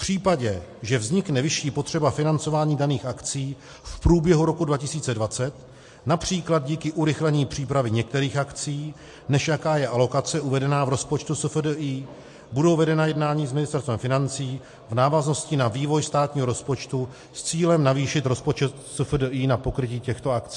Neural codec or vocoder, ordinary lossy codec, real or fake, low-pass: none; MP3, 48 kbps; real; 10.8 kHz